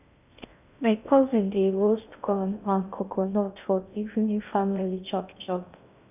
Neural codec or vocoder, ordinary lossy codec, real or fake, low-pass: codec, 16 kHz in and 24 kHz out, 0.6 kbps, FocalCodec, streaming, 2048 codes; none; fake; 3.6 kHz